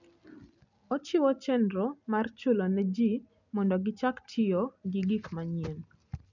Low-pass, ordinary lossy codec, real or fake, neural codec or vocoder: 7.2 kHz; none; real; none